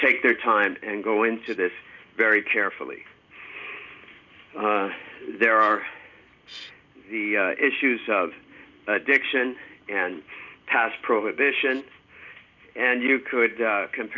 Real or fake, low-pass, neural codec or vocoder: real; 7.2 kHz; none